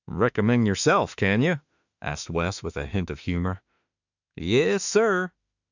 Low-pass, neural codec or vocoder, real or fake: 7.2 kHz; autoencoder, 48 kHz, 32 numbers a frame, DAC-VAE, trained on Japanese speech; fake